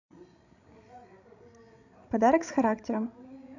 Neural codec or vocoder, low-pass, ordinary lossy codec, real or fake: codec, 16 kHz, 16 kbps, FreqCodec, larger model; 7.2 kHz; none; fake